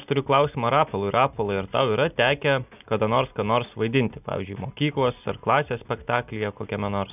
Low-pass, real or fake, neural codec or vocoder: 3.6 kHz; real; none